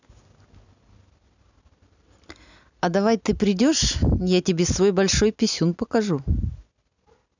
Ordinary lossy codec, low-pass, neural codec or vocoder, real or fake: none; 7.2 kHz; none; real